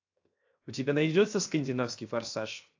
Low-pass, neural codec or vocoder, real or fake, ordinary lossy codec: 7.2 kHz; codec, 16 kHz, 0.7 kbps, FocalCodec; fake; AAC, 48 kbps